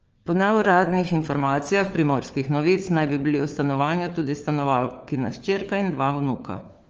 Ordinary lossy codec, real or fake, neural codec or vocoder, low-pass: Opus, 24 kbps; fake; codec, 16 kHz, 4 kbps, FunCodec, trained on LibriTTS, 50 frames a second; 7.2 kHz